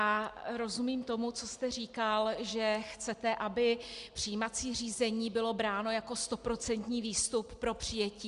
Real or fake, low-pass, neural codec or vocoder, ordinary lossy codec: real; 10.8 kHz; none; Opus, 64 kbps